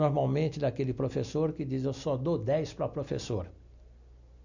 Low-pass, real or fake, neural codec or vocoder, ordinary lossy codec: 7.2 kHz; real; none; none